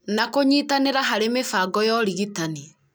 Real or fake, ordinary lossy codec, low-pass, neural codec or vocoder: real; none; none; none